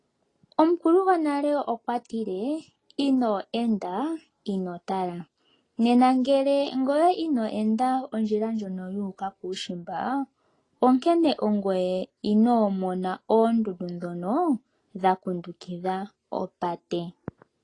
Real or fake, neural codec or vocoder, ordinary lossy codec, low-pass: real; none; AAC, 32 kbps; 10.8 kHz